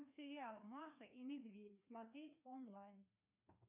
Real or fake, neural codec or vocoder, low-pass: fake; codec, 16 kHz, 2 kbps, FreqCodec, larger model; 3.6 kHz